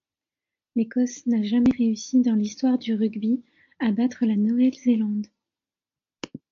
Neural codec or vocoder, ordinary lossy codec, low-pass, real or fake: none; AAC, 48 kbps; 7.2 kHz; real